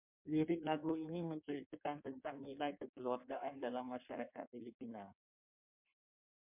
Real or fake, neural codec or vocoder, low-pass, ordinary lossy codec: fake; codec, 16 kHz in and 24 kHz out, 1.1 kbps, FireRedTTS-2 codec; 3.6 kHz; MP3, 32 kbps